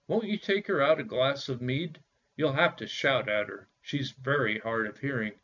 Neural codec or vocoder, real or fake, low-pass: none; real; 7.2 kHz